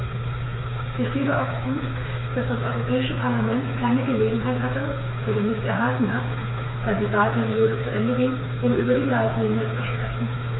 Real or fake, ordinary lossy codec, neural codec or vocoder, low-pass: fake; AAC, 16 kbps; codec, 16 kHz, 4 kbps, FreqCodec, smaller model; 7.2 kHz